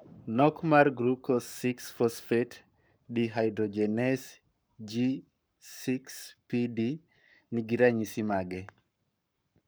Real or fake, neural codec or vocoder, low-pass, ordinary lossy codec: fake; codec, 44.1 kHz, 7.8 kbps, Pupu-Codec; none; none